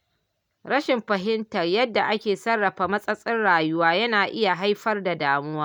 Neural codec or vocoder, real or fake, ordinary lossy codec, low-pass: none; real; none; 19.8 kHz